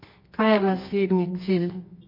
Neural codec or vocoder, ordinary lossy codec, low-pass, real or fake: codec, 24 kHz, 0.9 kbps, WavTokenizer, medium music audio release; MP3, 32 kbps; 5.4 kHz; fake